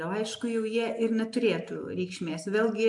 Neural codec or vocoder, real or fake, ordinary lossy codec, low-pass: none; real; AAC, 64 kbps; 10.8 kHz